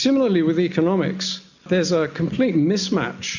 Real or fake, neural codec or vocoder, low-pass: real; none; 7.2 kHz